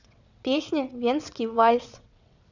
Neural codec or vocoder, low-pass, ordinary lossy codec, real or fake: vocoder, 22.05 kHz, 80 mel bands, WaveNeXt; 7.2 kHz; none; fake